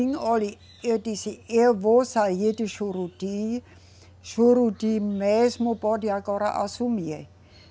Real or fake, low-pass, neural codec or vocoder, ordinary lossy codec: real; none; none; none